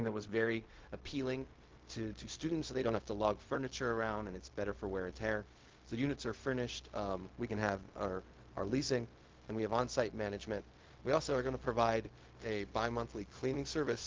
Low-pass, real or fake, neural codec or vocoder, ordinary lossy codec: 7.2 kHz; fake; codec, 16 kHz, 0.4 kbps, LongCat-Audio-Codec; Opus, 16 kbps